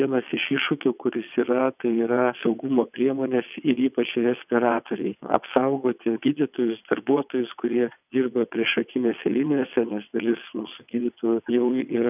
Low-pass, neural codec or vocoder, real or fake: 3.6 kHz; vocoder, 22.05 kHz, 80 mel bands, WaveNeXt; fake